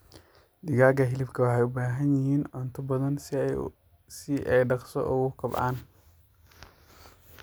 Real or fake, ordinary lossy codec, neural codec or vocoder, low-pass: real; none; none; none